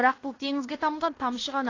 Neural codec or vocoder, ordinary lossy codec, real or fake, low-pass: codec, 16 kHz in and 24 kHz out, 0.9 kbps, LongCat-Audio-Codec, fine tuned four codebook decoder; AAC, 32 kbps; fake; 7.2 kHz